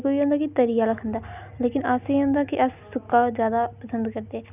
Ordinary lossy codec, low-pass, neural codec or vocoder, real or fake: none; 3.6 kHz; none; real